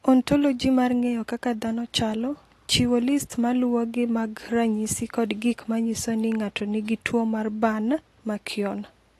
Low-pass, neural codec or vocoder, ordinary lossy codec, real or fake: 14.4 kHz; none; AAC, 48 kbps; real